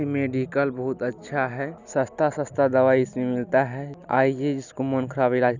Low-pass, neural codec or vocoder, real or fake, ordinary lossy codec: 7.2 kHz; none; real; none